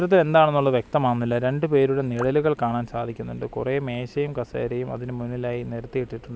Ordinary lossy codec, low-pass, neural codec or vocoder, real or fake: none; none; none; real